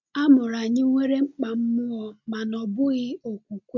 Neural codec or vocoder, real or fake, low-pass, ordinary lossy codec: none; real; 7.2 kHz; MP3, 64 kbps